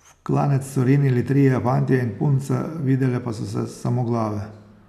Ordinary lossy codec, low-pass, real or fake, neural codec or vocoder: none; 14.4 kHz; real; none